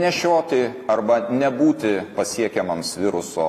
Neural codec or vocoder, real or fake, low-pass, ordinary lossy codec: none; real; 14.4 kHz; AAC, 48 kbps